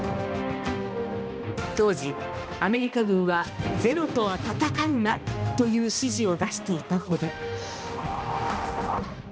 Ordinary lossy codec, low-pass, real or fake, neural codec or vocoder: none; none; fake; codec, 16 kHz, 1 kbps, X-Codec, HuBERT features, trained on balanced general audio